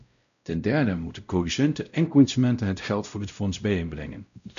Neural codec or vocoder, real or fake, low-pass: codec, 16 kHz, 0.5 kbps, X-Codec, WavLM features, trained on Multilingual LibriSpeech; fake; 7.2 kHz